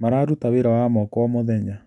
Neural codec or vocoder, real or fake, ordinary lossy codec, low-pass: none; real; none; 10.8 kHz